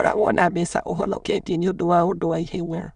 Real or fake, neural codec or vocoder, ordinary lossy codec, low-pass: fake; autoencoder, 22.05 kHz, a latent of 192 numbers a frame, VITS, trained on many speakers; none; 9.9 kHz